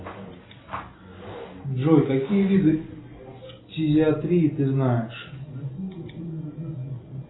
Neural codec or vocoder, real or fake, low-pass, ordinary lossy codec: none; real; 7.2 kHz; AAC, 16 kbps